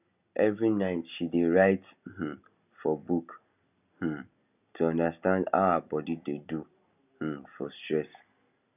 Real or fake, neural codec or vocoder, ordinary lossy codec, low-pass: real; none; none; 3.6 kHz